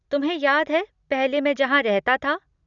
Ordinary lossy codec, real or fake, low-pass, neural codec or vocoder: none; real; 7.2 kHz; none